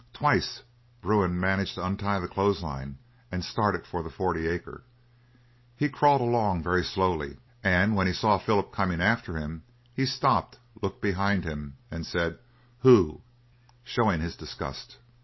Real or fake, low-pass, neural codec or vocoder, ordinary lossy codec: real; 7.2 kHz; none; MP3, 24 kbps